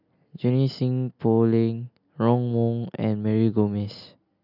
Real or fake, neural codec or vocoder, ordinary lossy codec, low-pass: real; none; none; 5.4 kHz